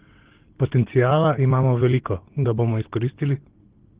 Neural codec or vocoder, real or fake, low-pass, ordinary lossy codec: none; real; 3.6 kHz; Opus, 16 kbps